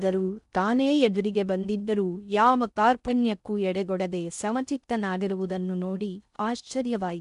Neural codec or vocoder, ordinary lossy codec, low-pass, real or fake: codec, 16 kHz in and 24 kHz out, 0.6 kbps, FocalCodec, streaming, 4096 codes; none; 10.8 kHz; fake